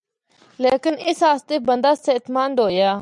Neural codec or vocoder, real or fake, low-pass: none; real; 10.8 kHz